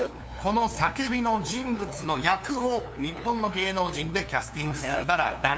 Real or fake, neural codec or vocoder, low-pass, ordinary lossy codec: fake; codec, 16 kHz, 2 kbps, FunCodec, trained on LibriTTS, 25 frames a second; none; none